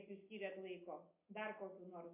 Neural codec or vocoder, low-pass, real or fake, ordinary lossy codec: none; 3.6 kHz; real; MP3, 24 kbps